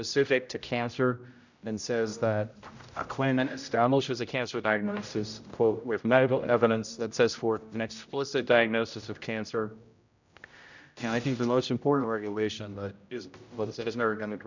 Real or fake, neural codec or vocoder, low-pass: fake; codec, 16 kHz, 0.5 kbps, X-Codec, HuBERT features, trained on general audio; 7.2 kHz